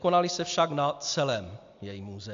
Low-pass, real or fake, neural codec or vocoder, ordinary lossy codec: 7.2 kHz; real; none; AAC, 64 kbps